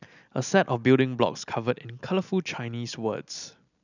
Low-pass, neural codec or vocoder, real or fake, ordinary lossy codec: 7.2 kHz; none; real; none